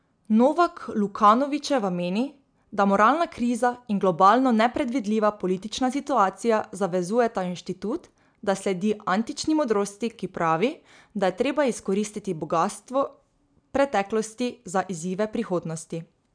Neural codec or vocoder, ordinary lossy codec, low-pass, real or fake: none; none; 9.9 kHz; real